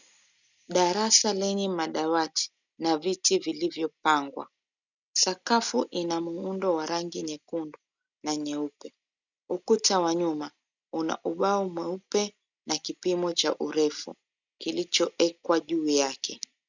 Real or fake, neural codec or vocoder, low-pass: real; none; 7.2 kHz